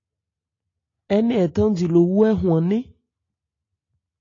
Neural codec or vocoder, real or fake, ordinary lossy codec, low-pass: none; real; AAC, 32 kbps; 7.2 kHz